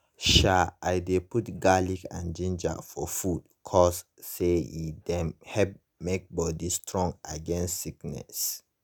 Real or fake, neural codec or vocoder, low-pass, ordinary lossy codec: real; none; none; none